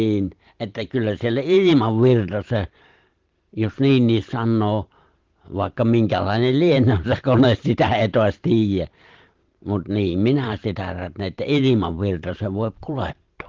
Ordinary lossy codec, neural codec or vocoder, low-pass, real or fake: Opus, 16 kbps; vocoder, 44.1 kHz, 128 mel bands every 512 samples, BigVGAN v2; 7.2 kHz; fake